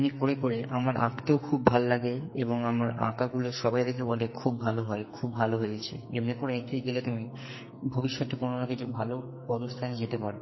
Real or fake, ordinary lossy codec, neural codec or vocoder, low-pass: fake; MP3, 24 kbps; codec, 44.1 kHz, 2.6 kbps, SNAC; 7.2 kHz